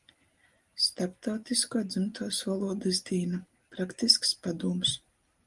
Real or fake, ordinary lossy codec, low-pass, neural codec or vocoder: real; Opus, 24 kbps; 10.8 kHz; none